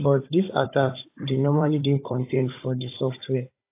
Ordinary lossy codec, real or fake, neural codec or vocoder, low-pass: AAC, 24 kbps; fake; codec, 16 kHz, 16 kbps, FunCodec, trained on Chinese and English, 50 frames a second; 3.6 kHz